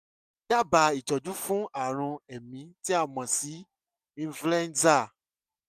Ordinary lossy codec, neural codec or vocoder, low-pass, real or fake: none; none; 14.4 kHz; real